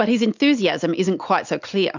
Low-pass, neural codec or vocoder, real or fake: 7.2 kHz; none; real